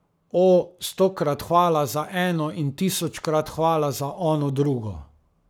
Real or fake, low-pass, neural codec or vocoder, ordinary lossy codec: fake; none; codec, 44.1 kHz, 7.8 kbps, Pupu-Codec; none